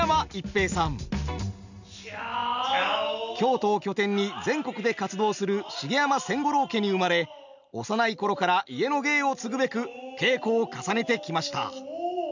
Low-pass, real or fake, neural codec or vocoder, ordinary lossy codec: 7.2 kHz; real; none; none